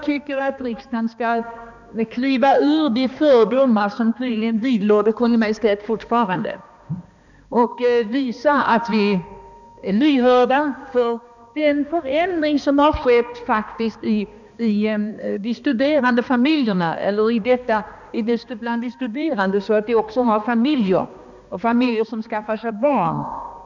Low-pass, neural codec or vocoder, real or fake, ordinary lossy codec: 7.2 kHz; codec, 16 kHz, 2 kbps, X-Codec, HuBERT features, trained on balanced general audio; fake; none